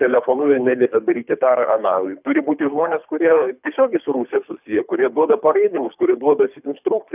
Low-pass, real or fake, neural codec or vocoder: 3.6 kHz; fake; codec, 24 kHz, 3 kbps, HILCodec